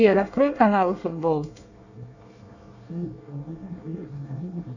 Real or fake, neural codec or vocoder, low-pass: fake; codec, 24 kHz, 1 kbps, SNAC; 7.2 kHz